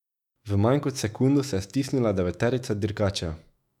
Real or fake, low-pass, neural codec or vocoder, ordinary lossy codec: fake; 19.8 kHz; autoencoder, 48 kHz, 128 numbers a frame, DAC-VAE, trained on Japanese speech; none